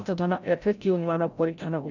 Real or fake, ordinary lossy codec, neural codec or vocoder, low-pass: fake; none; codec, 16 kHz, 0.5 kbps, FreqCodec, larger model; 7.2 kHz